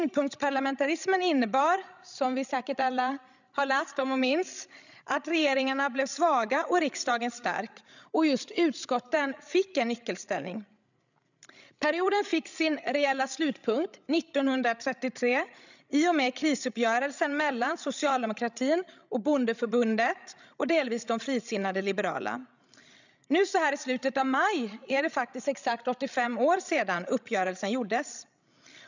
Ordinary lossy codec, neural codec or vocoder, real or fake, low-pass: none; codec, 16 kHz, 16 kbps, FreqCodec, larger model; fake; 7.2 kHz